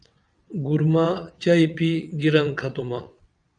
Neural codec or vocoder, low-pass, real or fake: vocoder, 22.05 kHz, 80 mel bands, WaveNeXt; 9.9 kHz; fake